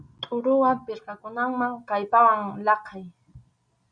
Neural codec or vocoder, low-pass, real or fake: none; 9.9 kHz; real